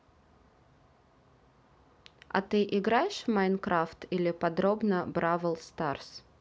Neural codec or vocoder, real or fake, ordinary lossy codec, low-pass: none; real; none; none